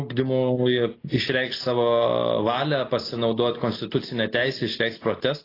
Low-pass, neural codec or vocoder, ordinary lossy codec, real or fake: 5.4 kHz; none; AAC, 24 kbps; real